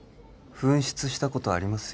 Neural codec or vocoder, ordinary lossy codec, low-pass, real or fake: none; none; none; real